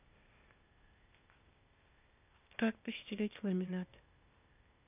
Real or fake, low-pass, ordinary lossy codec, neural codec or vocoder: fake; 3.6 kHz; none; codec, 16 kHz, 0.8 kbps, ZipCodec